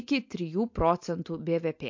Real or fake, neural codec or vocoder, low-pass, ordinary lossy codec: real; none; 7.2 kHz; MP3, 48 kbps